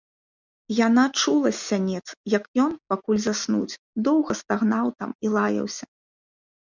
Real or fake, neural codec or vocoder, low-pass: real; none; 7.2 kHz